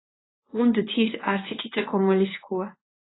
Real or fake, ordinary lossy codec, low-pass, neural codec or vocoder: fake; AAC, 16 kbps; 7.2 kHz; codec, 24 kHz, 0.9 kbps, WavTokenizer, medium speech release version 1